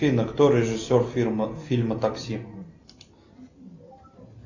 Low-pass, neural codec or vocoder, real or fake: 7.2 kHz; none; real